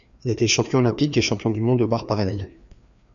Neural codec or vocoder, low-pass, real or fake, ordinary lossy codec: codec, 16 kHz, 2 kbps, FreqCodec, larger model; 7.2 kHz; fake; MP3, 96 kbps